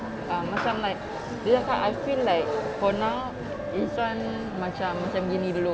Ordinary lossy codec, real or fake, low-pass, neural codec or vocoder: none; real; none; none